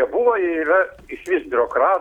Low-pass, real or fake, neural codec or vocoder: 19.8 kHz; real; none